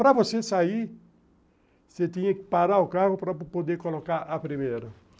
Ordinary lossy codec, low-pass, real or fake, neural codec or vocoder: none; none; real; none